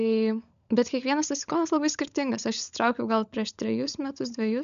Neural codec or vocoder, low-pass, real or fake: codec, 16 kHz, 16 kbps, FunCodec, trained on LibriTTS, 50 frames a second; 7.2 kHz; fake